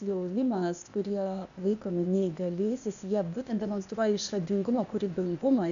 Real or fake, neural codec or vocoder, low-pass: fake; codec, 16 kHz, 0.8 kbps, ZipCodec; 7.2 kHz